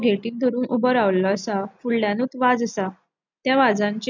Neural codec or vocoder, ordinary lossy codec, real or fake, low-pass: none; none; real; 7.2 kHz